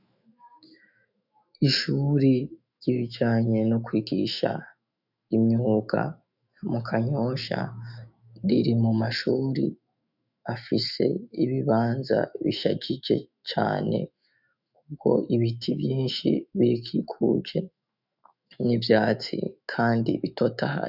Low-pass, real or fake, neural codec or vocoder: 5.4 kHz; fake; autoencoder, 48 kHz, 128 numbers a frame, DAC-VAE, trained on Japanese speech